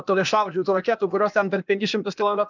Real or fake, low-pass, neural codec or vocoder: fake; 7.2 kHz; codec, 16 kHz, 0.8 kbps, ZipCodec